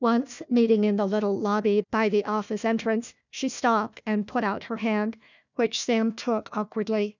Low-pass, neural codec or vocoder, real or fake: 7.2 kHz; codec, 16 kHz, 1 kbps, FunCodec, trained on Chinese and English, 50 frames a second; fake